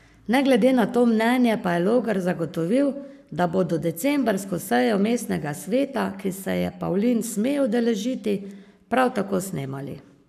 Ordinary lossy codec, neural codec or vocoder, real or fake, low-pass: none; codec, 44.1 kHz, 7.8 kbps, Pupu-Codec; fake; 14.4 kHz